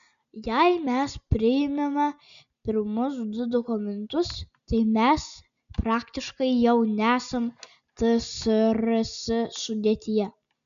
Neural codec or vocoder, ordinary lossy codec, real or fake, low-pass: none; AAC, 96 kbps; real; 7.2 kHz